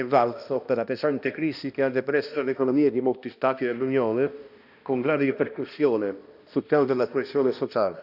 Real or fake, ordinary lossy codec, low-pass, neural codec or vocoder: fake; none; 5.4 kHz; codec, 16 kHz, 1 kbps, X-Codec, HuBERT features, trained on balanced general audio